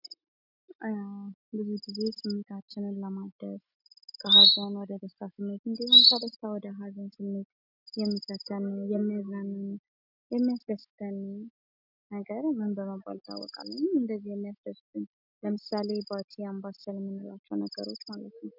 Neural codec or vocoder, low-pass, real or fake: none; 5.4 kHz; real